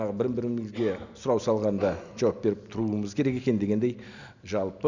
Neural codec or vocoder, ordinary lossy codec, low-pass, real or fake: none; none; 7.2 kHz; real